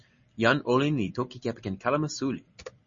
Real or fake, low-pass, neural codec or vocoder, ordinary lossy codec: real; 7.2 kHz; none; MP3, 32 kbps